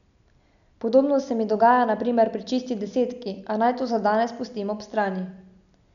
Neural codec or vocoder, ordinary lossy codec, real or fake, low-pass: none; none; real; 7.2 kHz